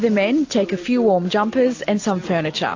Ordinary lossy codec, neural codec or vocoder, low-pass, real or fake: AAC, 48 kbps; none; 7.2 kHz; real